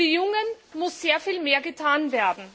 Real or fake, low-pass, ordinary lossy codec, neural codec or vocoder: real; none; none; none